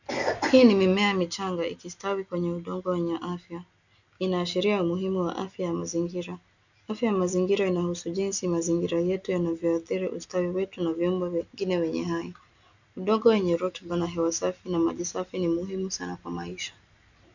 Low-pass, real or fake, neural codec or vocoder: 7.2 kHz; real; none